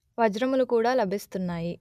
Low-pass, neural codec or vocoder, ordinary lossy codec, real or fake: 14.4 kHz; none; none; real